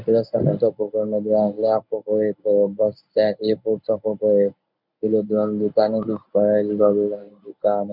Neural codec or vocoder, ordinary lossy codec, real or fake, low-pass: codec, 24 kHz, 0.9 kbps, WavTokenizer, medium speech release version 1; none; fake; 5.4 kHz